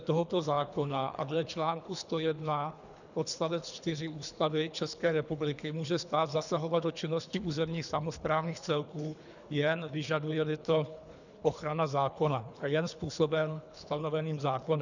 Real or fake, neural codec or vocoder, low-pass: fake; codec, 24 kHz, 3 kbps, HILCodec; 7.2 kHz